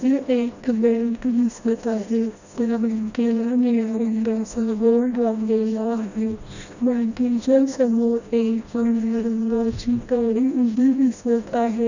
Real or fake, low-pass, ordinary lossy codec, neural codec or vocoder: fake; 7.2 kHz; none; codec, 16 kHz, 1 kbps, FreqCodec, smaller model